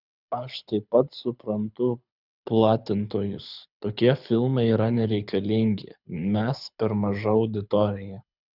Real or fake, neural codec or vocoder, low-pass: fake; codec, 24 kHz, 6 kbps, HILCodec; 5.4 kHz